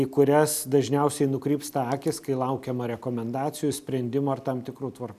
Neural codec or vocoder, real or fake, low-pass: none; real; 14.4 kHz